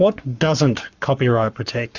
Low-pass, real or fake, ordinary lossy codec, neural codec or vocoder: 7.2 kHz; fake; Opus, 64 kbps; codec, 44.1 kHz, 7.8 kbps, Pupu-Codec